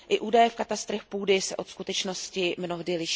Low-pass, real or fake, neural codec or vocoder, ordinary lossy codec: none; real; none; none